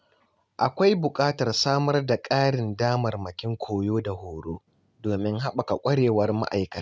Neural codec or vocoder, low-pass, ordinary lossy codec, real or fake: none; none; none; real